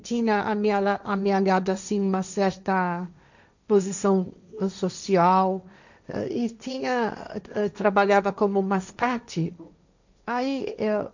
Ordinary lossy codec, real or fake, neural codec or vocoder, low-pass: none; fake; codec, 16 kHz, 1.1 kbps, Voila-Tokenizer; 7.2 kHz